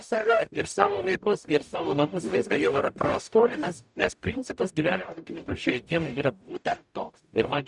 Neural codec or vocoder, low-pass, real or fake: codec, 44.1 kHz, 0.9 kbps, DAC; 10.8 kHz; fake